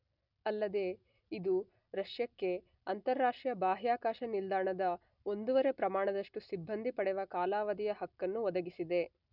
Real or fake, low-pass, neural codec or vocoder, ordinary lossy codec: real; 5.4 kHz; none; none